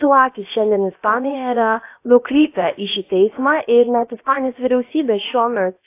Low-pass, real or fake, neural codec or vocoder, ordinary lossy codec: 3.6 kHz; fake; codec, 16 kHz, about 1 kbps, DyCAST, with the encoder's durations; AAC, 24 kbps